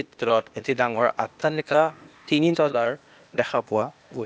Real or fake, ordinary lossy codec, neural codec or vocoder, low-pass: fake; none; codec, 16 kHz, 0.8 kbps, ZipCodec; none